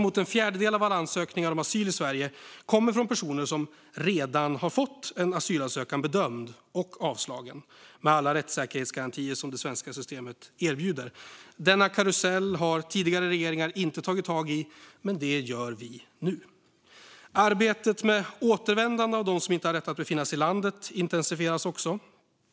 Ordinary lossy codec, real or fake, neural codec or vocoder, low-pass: none; real; none; none